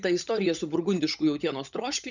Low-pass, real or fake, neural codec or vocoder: 7.2 kHz; fake; codec, 16 kHz, 16 kbps, FunCodec, trained on LibriTTS, 50 frames a second